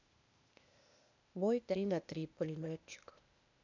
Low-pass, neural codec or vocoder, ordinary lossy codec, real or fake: 7.2 kHz; codec, 16 kHz, 0.8 kbps, ZipCodec; none; fake